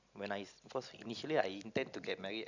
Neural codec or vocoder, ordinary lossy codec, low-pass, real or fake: none; none; 7.2 kHz; real